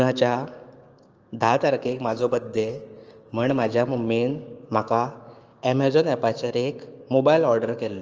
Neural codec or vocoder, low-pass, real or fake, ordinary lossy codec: codec, 16 kHz, 6 kbps, DAC; 7.2 kHz; fake; Opus, 24 kbps